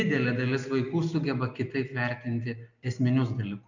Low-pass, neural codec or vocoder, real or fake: 7.2 kHz; none; real